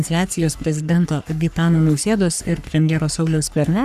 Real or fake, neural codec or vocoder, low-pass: fake; codec, 44.1 kHz, 3.4 kbps, Pupu-Codec; 14.4 kHz